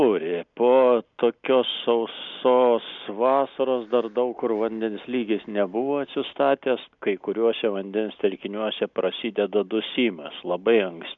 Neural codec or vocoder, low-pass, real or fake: none; 7.2 kHz; real